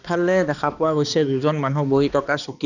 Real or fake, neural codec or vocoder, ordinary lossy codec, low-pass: fake; codec, 16 kHz, 2 kbps, X-Codec, HuBERT features, trained on balanced general audio; none; 7.2 kHz